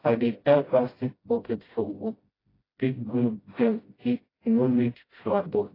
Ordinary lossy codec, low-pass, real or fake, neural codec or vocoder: AAC, 24 kbps; 5.4 kHz; fake; codec, 16 kHz, 0.5 kbps, FreqCodec, smaller model